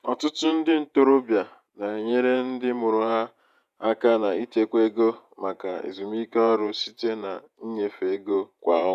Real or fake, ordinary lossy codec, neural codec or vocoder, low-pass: fake; none; vocoder, 48 kHz, 128 mel bands, Vocos; 14.4 kHz